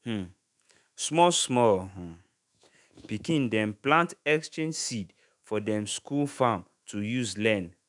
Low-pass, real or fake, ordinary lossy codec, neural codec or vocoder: 10.8 kHz; fake; none; autoencoder, 48 kHz, 128 numbers a frame, DAC-VAE, trained on Japanese speech